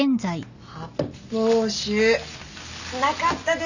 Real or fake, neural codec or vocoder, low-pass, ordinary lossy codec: real; none; 7.2 kHz; none